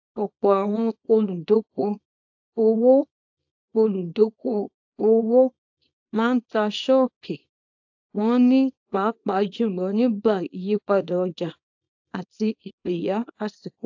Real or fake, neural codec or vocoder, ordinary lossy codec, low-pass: fake; codec, 24 kHz, 0.9 kbps, WavTokenizer, small release; AAC, 48 kbps; 7.2 kHz